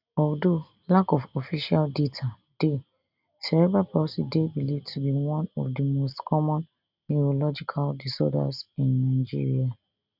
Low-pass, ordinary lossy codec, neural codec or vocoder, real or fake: 5.4 kHz; none; none; real